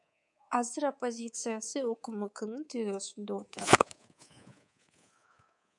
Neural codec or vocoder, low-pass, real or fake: codec, 24 kHz, 3.1 kbps, DualCodec; 10.8 kHz; fake